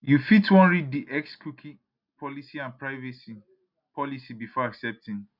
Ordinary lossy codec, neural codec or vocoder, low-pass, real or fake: none; none; 5.4 kHz; real